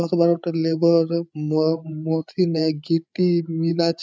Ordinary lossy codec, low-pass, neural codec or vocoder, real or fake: none; none; codec, 16 kHz, 8 kbps, FreqCodec, larger model; fake